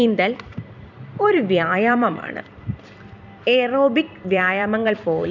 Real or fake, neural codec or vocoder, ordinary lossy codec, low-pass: fake; vocoder, 44.1 kHz, 128 mel bands every 256 samples, BigVGAN v2; none; 7.2 kHz